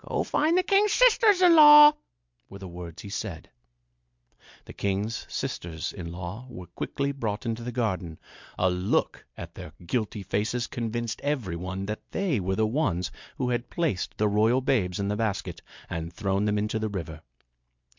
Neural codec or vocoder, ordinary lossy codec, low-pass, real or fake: none; MP3, 64 kbps; 7.2 kHz; real